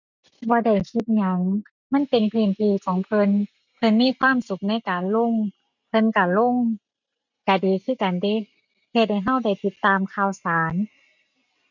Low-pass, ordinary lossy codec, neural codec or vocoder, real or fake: 7.2 kHz; none; none; real